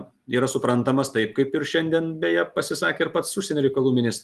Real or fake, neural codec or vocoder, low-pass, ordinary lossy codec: real; none; 14.4 kHz; Opus, 24 kbps